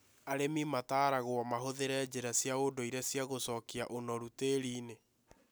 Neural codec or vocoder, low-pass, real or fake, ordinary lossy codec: none; none; real; none